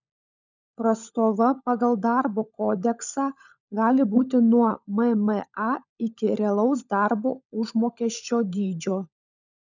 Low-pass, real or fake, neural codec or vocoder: 7.2 kHz; fake; codec, 16 kHz, 16 kbps, FunCodec, trained on LibriTTS, 50 frames a second